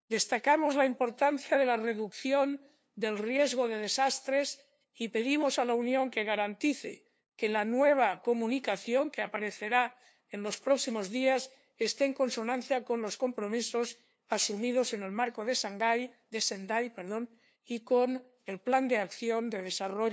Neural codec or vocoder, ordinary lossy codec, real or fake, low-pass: codec, 16 kHz, 2 kbps, FunCodec, trained on LibriTTS, 25 frames a second; none; fake; none